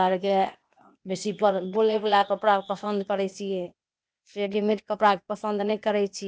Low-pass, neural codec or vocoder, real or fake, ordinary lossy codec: none; codec, 16 kHz, 0.8 kbps, ZipCodec; fake; none